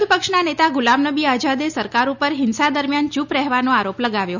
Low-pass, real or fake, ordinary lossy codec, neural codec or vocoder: 7.2 kHz; real; none; none